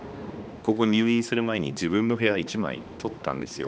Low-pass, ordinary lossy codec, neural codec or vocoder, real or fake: none; none; codec, 16 kHz, 2 kbps, X-Codec, HuBERT features, trained on balanced general audio; fake